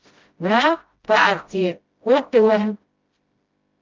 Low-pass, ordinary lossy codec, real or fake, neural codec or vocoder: 7.2 kHz; Opus, 32 kbps; fake; codec, 16 kHz, 0.5 kbps, FreqCodec, smaller model